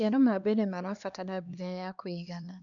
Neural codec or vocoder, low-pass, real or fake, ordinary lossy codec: codec, 16 kHz, 2 kbps, X-Codec, HuBERT features, trained on LibriSpeech; 7.2 kHz; fake; none